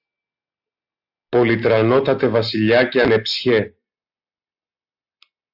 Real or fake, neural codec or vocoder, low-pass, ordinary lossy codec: real; none; 5.4 kHz; AAC, 48 kbps